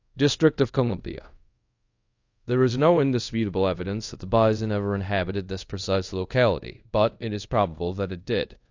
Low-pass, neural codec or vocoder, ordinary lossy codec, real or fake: 7.2 kHz; codec, 24 kHz, 0.5 kbps, DualCodec; AAC, 48 kbps; fake